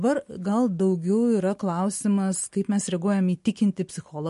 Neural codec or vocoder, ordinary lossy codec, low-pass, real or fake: none; MP3, 48 kbps; 14.4 kHz; real